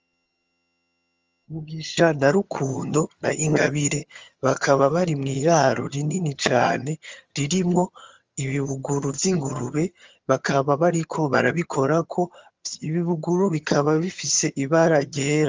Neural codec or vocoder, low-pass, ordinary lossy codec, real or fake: vocoder, 22.05 kHz, 80 mel bands, HiFi-GAN; 7.2 kHz; Opus, 32 kbps; fake